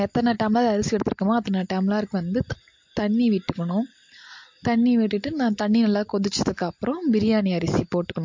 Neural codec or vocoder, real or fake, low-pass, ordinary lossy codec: none; real; 7.2 kHz; MP3, 48 kbps